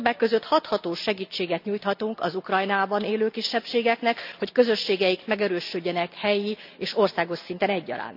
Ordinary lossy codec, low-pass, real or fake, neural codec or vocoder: none; 5.4 kHz; real; none